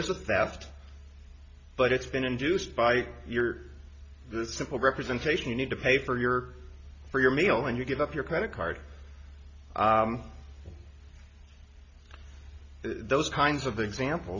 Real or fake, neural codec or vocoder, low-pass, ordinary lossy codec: real; none; 7.2 kHz; MP3, 48 kbps